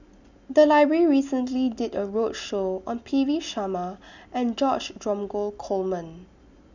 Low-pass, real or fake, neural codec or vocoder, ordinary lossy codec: 7.2 kHz; real; none; none